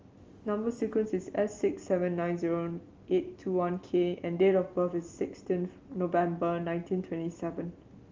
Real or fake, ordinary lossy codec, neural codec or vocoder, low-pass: real; Opus, 32 kbps; none; 7.2 kHz